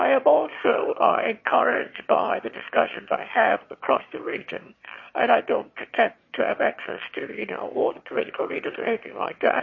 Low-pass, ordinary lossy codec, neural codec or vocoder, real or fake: 7.2 kHz; MP3, 32 kbps; autoencoder, 22.05 kHz, a latent of 192 numbers a frame, VITS, trained on one speaker; fake